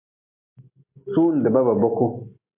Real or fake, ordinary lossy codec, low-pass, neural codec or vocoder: real; MP3, 32 kbps; 3.6 kHz; none